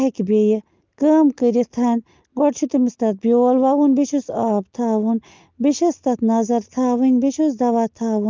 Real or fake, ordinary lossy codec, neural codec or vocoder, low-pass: real; Opus, 24 kbps; none; 7.2 kHz